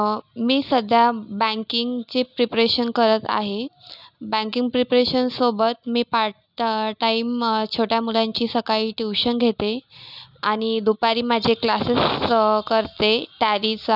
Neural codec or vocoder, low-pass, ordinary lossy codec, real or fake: none; 5.4 kHz; none; real